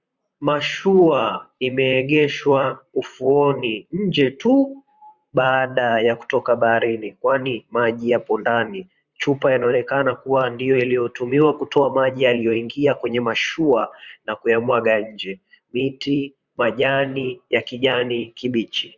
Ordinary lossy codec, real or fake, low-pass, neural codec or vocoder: Opus, 64 kbps; fake; 7.2 kHz; vocoder, 44.1 kHz, 128 mel bands, Pupu-Vocoder